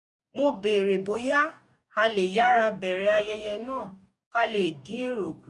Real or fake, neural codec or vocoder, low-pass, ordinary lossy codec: fake; codec, 44.1 kHz, 2.6 kbps, DAC; 10.8 kHz; none